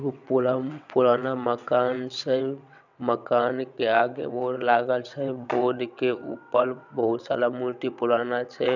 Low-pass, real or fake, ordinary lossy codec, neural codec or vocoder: 7.2 kHz; fake; none; vocoder, 22.05 kHz, 80 mel bands, WaveNeXt